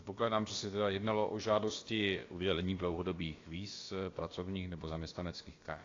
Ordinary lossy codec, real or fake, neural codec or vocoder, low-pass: AAC, 32 kbps; fake; codec, 16 kHz, about 1 kbps, DyCAST, with the encoder's durations; 7.2 kHz